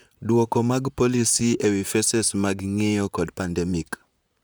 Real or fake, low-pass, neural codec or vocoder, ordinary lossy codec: fake; none; vocoder, 44.1 kHz, 128 mel bands, Pupu-Vocoder; none